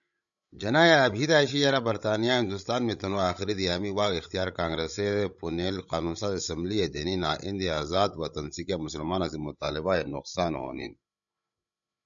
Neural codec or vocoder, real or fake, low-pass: codec, 16 kHz, 16 kbps, FreqCodec, larger model; fake; 7.2 kHz